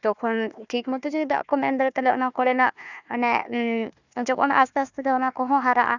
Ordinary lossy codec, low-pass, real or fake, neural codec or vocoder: none; 7.2 kHz; fake; codec, 16 kHz, 1 kbps, FunCodec, trained on Chinese and English, 50 frames a second